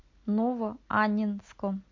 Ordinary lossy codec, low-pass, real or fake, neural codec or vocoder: MP3, 48 kbps; 7.2 kHz; real; none